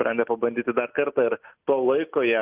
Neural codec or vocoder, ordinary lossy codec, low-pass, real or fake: none; Opus, 32 kbps; 3.6 kHz; real